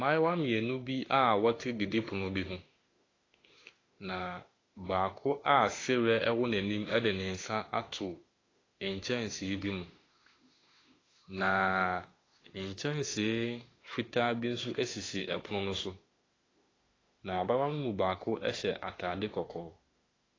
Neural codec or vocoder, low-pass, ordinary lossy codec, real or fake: autoencoder, 48 kHz, 32 numbers a frame, DAC-VAE, trained on Japanese speech; 7.2 kHz; AAC, 32 kbps; fake